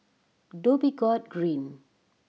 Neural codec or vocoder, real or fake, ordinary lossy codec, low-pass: none; real; none; none